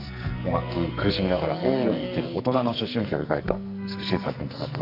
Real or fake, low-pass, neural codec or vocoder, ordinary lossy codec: fake; 5.4 kHz; codec, 44.1 kHz, 2.6 kbps, SNAC; none